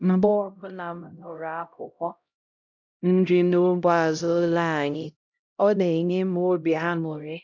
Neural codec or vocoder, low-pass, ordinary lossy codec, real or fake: codec, 16 kHz, 0.5 kbps, X-Codec, HuBERT features, trained on LibriSpeech; 7.2 kHz; none; fake